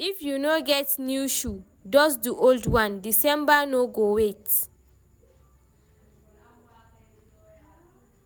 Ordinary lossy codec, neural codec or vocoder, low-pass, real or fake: none; none; none; real